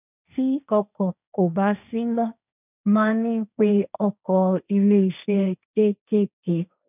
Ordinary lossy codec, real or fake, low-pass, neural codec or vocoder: none; fake; 3.6 kHz; codec, 16 kHz, 1.1 kbps, Voila-Tokenizer